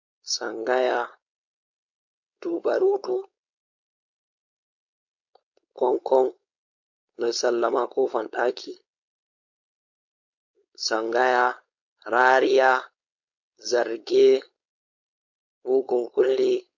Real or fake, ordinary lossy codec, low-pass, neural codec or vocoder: fake; MP3, 48 kbps; 7.2 kHz; codec, 16 kHz, 4.8 kbps, FACodec